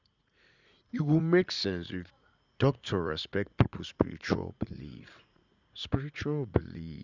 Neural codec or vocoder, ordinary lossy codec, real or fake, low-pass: vocoder, 44.1 kHz, 80 mel bands, Vocos; none; fake; 7.2 kHz